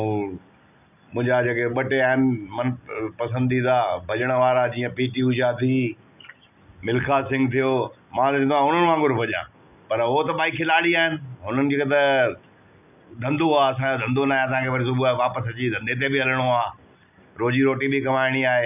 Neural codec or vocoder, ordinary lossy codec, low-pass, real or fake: none; none; 3.6 kHz; real